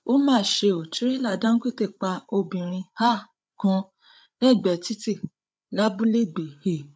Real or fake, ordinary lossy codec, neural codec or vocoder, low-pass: fake; none; codec, 16 kHz, 8 kbps, FreqCodec, larger model; none